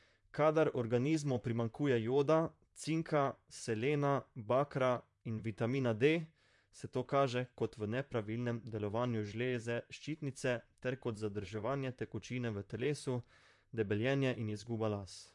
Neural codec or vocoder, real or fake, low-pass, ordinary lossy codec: vocoder, 44.1 kHz, 128 mel bands, Pupu-Vocoder; fake; 10.8 kHz; MP3, 64 kbps